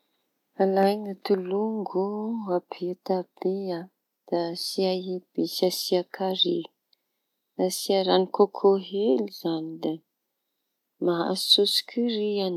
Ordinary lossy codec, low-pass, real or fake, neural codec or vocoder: none; 19.8 kHz; real; none